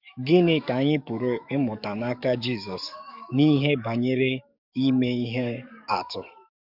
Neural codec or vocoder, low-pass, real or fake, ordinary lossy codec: codec, 44.1 kHz, 7.8 kbps, DAC; 5.4 kHz; fake; none